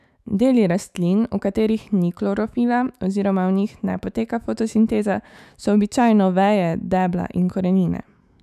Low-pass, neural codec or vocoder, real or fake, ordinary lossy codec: 14.4 kHz; autoencoder, 48 kHz, 128 numbers a frame, DAC-VAE, trained on Japanese speech; fake; none